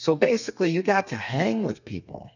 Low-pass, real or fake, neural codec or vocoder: 7.2 kHz; fake; codec, 32 kHz, 1.9 kbps, SNAC